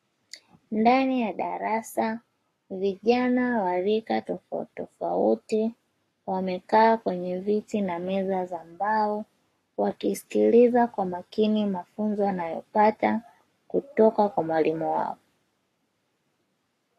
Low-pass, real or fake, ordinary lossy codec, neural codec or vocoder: 14.4 kHz; fake; AAC, 48 kbps; codec, 44.1 kHz, 7.8 kbps, Pupu-Codec